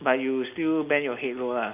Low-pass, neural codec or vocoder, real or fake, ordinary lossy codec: 3.6 kHz; none; real; none